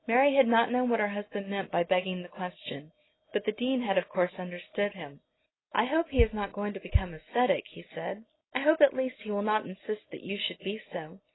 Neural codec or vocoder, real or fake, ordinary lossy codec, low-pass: none; real; AAC, 16 kbps; 7.2 kHz